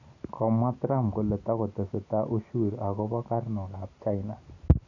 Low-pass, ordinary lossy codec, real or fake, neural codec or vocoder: 7.2 kHz; none; real; none